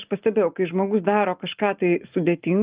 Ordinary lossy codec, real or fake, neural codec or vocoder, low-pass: Opus, 32 kbps; real; none; 3.6 kHz